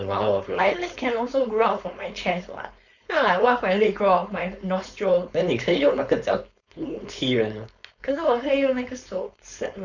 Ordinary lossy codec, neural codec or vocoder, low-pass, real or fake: none; codec, 16 kHz, 4.8 kbps, FACodec; 7.2 kHz; fake